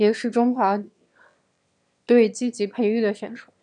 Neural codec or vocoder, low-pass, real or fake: autoencoder, 22.05 kHz, a latent of 192 numbers a frame, VITS, trained on one speaker; 9.9 kHz; fake